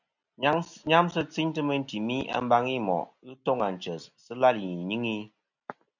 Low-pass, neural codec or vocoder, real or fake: 7.2 kHz; none; real